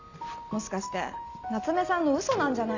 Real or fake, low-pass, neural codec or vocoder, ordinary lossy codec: real; 7.2 kHz; none; none